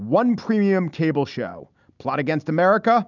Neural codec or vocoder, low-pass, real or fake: none; 7.2 kHz; real